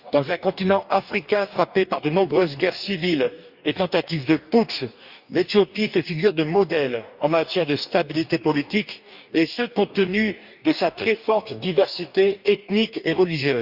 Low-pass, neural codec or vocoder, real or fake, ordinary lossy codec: 5.4 kHz; codec, 44.1 kHz, 2.6 kbps, DAC; fake; Opus, 64 kbps